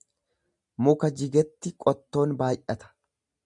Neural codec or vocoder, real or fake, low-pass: none; real; 10.8 kHz